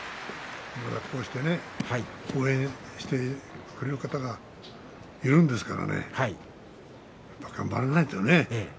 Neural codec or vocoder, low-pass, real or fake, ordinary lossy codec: none; none; real; none